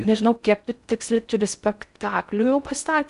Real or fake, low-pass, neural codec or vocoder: fake; 10.8 kHz; codec, 16 kHz in and 24 kHz out, 0.6 kbps, FocalCodec, streaming, 4096 codes